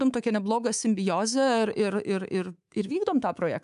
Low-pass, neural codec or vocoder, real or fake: 10.8 kHz; codec, 24 kHz, 3.1 kbps, DualCodec; fake